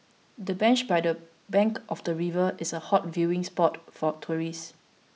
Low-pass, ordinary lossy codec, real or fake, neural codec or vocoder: none; none; real; none